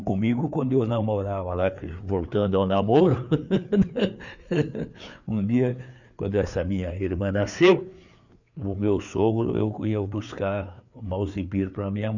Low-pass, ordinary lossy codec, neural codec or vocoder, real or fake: 7.2 kHz; none; codec, 16 kHz, 4 kbps, FreqCodec, larger model; fake